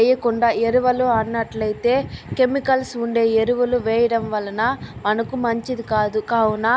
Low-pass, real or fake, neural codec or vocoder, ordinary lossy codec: none; real; none; none